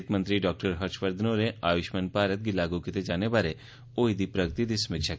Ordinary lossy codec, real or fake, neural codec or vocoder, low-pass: none; real; none; none